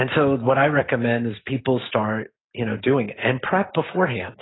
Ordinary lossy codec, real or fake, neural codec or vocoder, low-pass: AAC, 16 kbps; real; none; 7.2 kHz